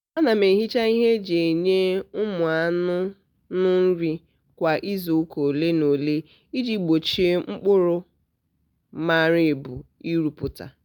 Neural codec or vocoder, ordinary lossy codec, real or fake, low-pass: none; none; real; 19.8 kHz